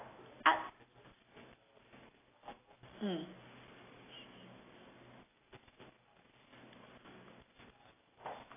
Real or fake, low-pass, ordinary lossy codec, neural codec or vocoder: real; 3.6 kHz; AAC, 16 kbps; none